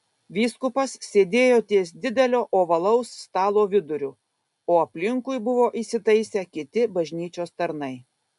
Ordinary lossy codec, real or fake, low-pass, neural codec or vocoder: MP3, 96 kbps; real; 10.8 kHz; none